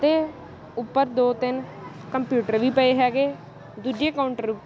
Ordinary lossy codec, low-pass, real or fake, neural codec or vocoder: none; none; real; none